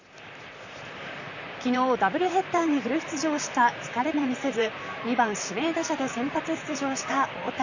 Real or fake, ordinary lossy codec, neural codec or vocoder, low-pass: fake; none; vocoder, 44.1 kHz, 128 mel bands, Pupu-Vocoder; 7.2 kHz